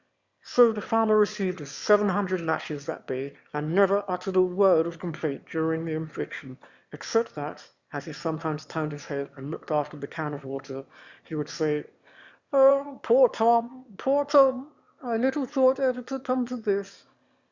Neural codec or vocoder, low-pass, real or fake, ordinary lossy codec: autoencoder, 22.05 kHz, a latent of 192 numbers a frame, VITS, trained on one speaker; 7.2 kHz; fake; Opus, 64 kbps